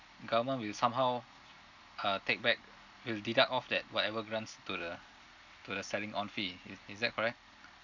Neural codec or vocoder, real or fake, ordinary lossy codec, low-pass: none; real; none; 7.2 kHz